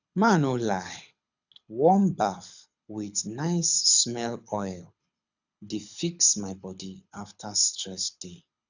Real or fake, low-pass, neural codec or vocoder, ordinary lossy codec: fake; 7.2 kHz; codec, 24 kHz, 6 kbps, HILCodec; none